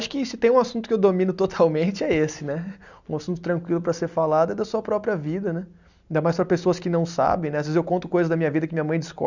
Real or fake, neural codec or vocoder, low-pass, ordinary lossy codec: real; none; 7.2 kHz; none